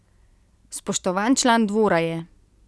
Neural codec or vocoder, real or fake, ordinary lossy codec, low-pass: none; real; none; none